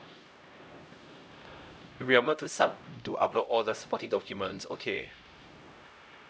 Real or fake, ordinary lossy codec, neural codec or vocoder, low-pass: fake; none; codec, 16 kHz, 0.5 kbps, X-Codec, HuBERT features, trained on LibriSpeech; none